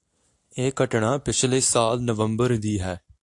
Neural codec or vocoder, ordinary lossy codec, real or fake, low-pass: none; AAC, 64 kbps; real; 10.8 kHz